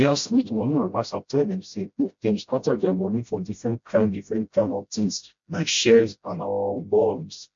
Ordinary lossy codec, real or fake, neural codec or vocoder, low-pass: MP3, 48 kbps; fake; codec, 16 kHz, 0.5 kbps, FreqCodec, smaller model; 7.2 kHz